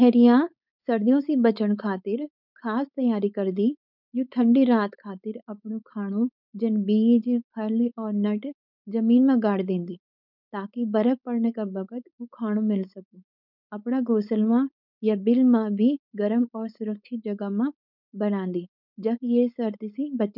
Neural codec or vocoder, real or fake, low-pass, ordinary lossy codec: codec, 16 kHz, 4.8 kbps, FACodec; fake; 5.4 kHz; none